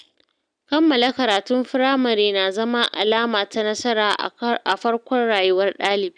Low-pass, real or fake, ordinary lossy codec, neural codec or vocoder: 9.9 kHz; real; none; none